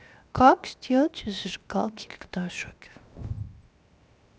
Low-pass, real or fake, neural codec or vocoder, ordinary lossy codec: none; fake; codec, 16 kHz, 0.7 kbps, FocalCodec; none